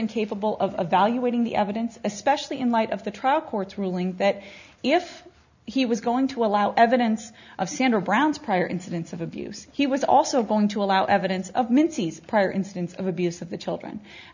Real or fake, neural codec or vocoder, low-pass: real; none; 7.2 kHz